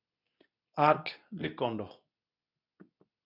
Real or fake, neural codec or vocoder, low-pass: fake; codec, 24 kHz, 0.9 kbps, WavTokenizer, medium speech release version 2; 5.4 kHz